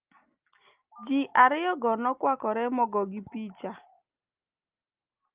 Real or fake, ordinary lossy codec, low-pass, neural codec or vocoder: real; Opus, 32 kbps; 3.6 kHz; none